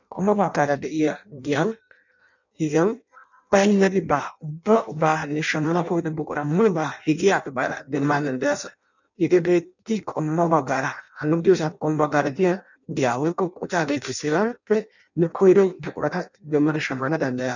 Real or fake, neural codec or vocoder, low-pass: fake; codec, 16 kHz in and 24 kHz out, 0.6 kbps, FireRedTTS-2 codec; 7.2 kHz